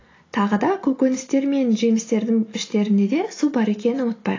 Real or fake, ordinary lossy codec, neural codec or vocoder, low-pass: real; AAC, 32 kbps; none; 7.2 kHz